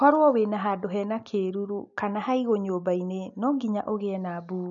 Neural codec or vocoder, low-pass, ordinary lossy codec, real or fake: none; 7.2 kHz; none; real